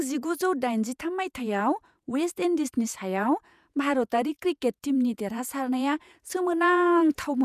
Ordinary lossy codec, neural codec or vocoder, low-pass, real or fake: none; vocoder, 48 kHz, 128 mel bands, Vocos; 14.4 kHz; fake